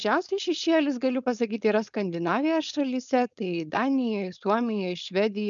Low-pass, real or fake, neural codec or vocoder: 7.2 kHz; fake; codec, 16 kHz, 4.8 kbps, FACodec